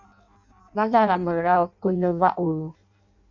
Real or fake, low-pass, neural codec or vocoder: fake; 7.2 kHz; codec, 16 kHz in and 24 kHz out, 0.6 kbps, FireRedTTS-2 codec